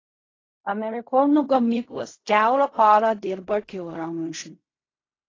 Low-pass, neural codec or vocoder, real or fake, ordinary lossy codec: 7.2 kHz; codec, 16 kHz in and 24 kHz out, 0.4 kbps, LongCat-Audio-Codec, fine tuned four codebook decoder; fake; AAC, 32 kbps